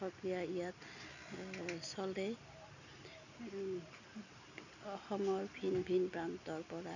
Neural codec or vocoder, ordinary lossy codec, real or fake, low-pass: none; none; real; 7.2 kHz